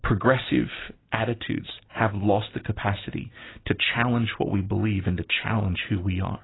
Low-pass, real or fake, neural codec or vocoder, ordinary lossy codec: 7.2 kHz; real; none; AAC, 16 kbps